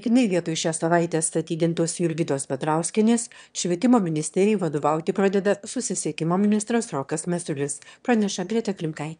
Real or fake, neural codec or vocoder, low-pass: fake; autoencoder, 22.05 kHz, a latent of 192 numbers a frame, VITS, trained on one speaker; 9.9 kHz